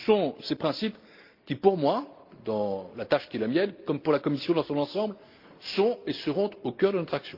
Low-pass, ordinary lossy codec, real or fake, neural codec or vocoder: 5.4 kHz; Opus, 24 kbps; real; none